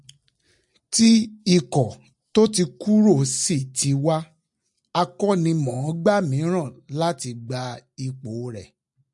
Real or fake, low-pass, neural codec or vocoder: real; 10.8 kHz; none